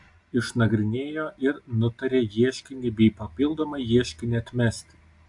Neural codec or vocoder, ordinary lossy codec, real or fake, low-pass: none; AAC, 64 kbps; real; 10.8 kHz